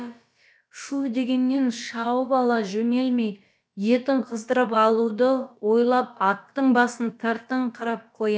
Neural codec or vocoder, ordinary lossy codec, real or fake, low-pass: codec, 16 kHz, about 1 kbps, DyCAST, with the encoder's durations; none; fake; none